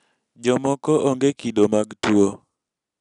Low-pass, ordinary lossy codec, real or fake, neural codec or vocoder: 10.8 kHz; none; real; none